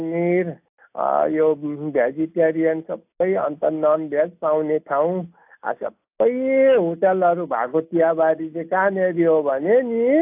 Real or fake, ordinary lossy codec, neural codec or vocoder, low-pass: real; AAC, 32 kbps; none; 3.6 kHz